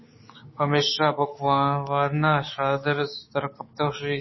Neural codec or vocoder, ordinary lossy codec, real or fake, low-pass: codec, 16 kHz, 6 kbps, DAC; MP3, 24 kbps; fake; 7.2 kHz